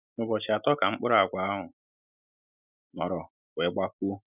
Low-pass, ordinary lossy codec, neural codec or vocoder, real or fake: 3.6 kHz; none; none; real